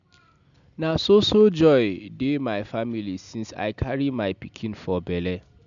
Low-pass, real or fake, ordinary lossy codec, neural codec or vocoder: 7.2 kHz; real; none; none